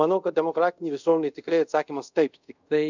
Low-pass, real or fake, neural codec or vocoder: 7.2 kHz; fake; codec, 24 kHz, 0.5 kbps, DualCodec